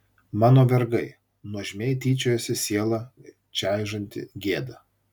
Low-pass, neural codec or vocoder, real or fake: 19.8 kHz; none; real